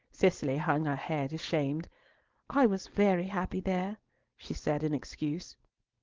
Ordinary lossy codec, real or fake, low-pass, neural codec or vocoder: Opus, 16 kbps; fake; 7.2 kHz; codec, 16 kHz, 4.8 kbps, FACodec